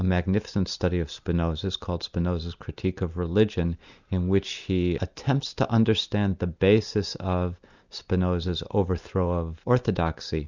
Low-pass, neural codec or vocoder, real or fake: 7.2 kHz; none; real